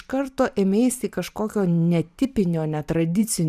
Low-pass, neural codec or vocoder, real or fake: 14.4 kHz; vocoder, 44.1 kHz, 128 mel bands every 512 samples, BigVGAN v2; fake